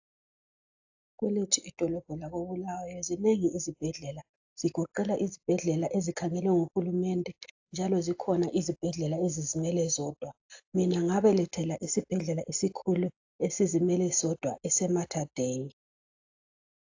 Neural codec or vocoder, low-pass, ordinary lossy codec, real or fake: none; 7.2 kHz; AAC, 48 kbps; real